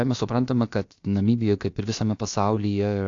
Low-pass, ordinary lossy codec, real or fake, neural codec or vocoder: 7.2 kHz; AAC, 48 kbps; fake; codec, 16 kHz, about 1 kbps, DyCAST, with the encoder's durations